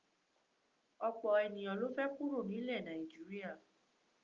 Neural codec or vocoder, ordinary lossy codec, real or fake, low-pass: none; Opus, 24 kbps; real; 7.2 kHz